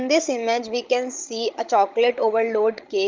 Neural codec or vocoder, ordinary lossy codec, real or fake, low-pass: codec, 16 kHz, 16 kbps, FreqCodec, larger model; Opus, 32 kbps; fake; 7.2 kHz